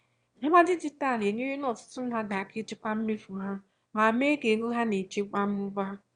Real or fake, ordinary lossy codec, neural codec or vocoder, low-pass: fake; Opus, 64 kbps; autoencoder, 22.05 kHz, a latent of 192 numbers a frame, VITS, trained on one speaker; 9.9 kHz